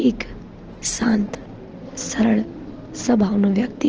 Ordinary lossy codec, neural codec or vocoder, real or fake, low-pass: Opus, 16 kbps; none; real; 7.2 kHz